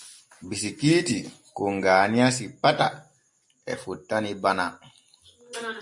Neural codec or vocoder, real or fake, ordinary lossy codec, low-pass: none; real; MP3, 48 kbps; 10.8 kHz